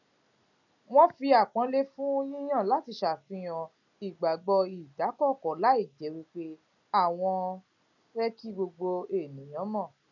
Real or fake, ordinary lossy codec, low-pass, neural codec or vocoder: real; none; 7.2 kHz; none